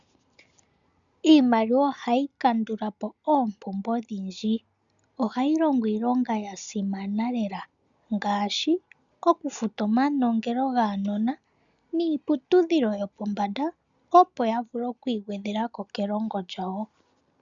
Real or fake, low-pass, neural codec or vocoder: real; 7.2 kHz; none